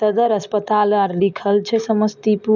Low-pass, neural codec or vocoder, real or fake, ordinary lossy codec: 7.2 kHz; none; real; none